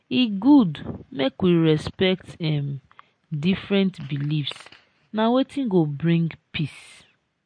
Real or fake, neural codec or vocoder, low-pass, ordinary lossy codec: real; none; 9.9 kHz; MP3, 48 kbps